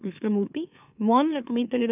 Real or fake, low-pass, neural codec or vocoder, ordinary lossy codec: fake; 3.6 kHz; autoencoder, 44.1 kHz, a latent of 192 numbers a frame, MeloTTS; none